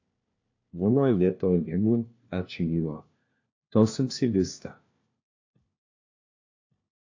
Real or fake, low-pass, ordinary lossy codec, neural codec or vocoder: fake; 7.2 kHz; AAC, 48 kbps; codec, 16 kHz, 1 kbps, FunCodec, trained on LibriTTS, 50 frames a second